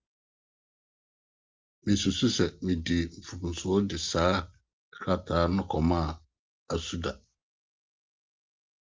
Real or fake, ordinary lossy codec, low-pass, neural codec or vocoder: real; Opus, 24 kbps; 7.2 kHz; none